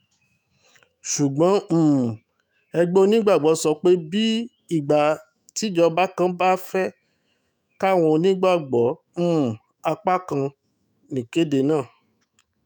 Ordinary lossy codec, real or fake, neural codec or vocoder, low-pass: none; fake; autoencoder, 48 kHz, 128 numbers a frame, DAC-VAE, trained on Japanese speech; none